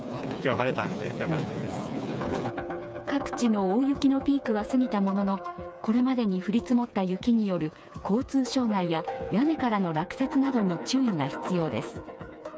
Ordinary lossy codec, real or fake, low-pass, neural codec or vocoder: none; fake; none; codec, 16 kHz, 4 kbps, FreqCodec, smaller model